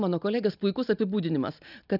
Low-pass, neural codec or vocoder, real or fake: 5.4 kHz; none; real